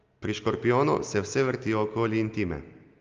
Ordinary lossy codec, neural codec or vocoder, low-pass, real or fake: Opus, 32 kbps; none; 7.2 kHz; real